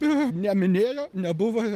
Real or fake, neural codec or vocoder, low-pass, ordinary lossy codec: real; none; 14.4 kHz; Opus, 32 kbps